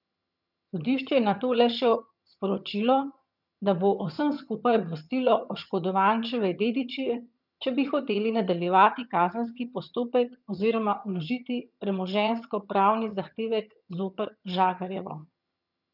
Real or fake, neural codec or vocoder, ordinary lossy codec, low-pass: fake; vocoder, 22.05 kHz, 80 mel bands, HiFi-GAN; none; 5.4 kHz